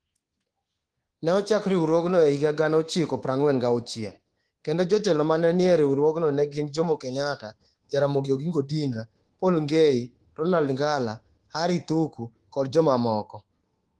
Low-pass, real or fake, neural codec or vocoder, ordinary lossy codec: 10.8 kHz; fake; codec, 24 kHz, 1.2 kbps, DualCodec; Opus, 16 kbps